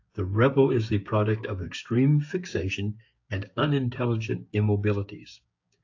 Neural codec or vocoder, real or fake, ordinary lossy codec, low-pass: codec, 16 kHz, 6 kbps, DAC; fake; AAC, 48 kbps; 7.2 kHz